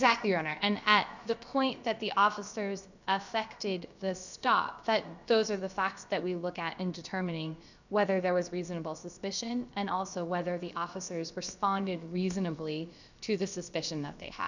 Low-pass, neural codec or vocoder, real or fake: 7.2 kHz; codec, 16 kHz, about 1 kbps, DyCAST, with the encoder's durations; fake